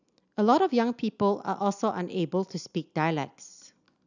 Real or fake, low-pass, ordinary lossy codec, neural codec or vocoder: real; 7.2 kHz; none; none